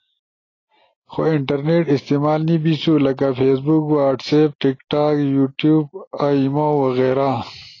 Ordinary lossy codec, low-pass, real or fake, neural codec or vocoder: AAC, 32 kbps; 7.2 kHz; real; none